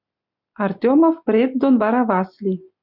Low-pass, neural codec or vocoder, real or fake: 5.4 kHz; none; real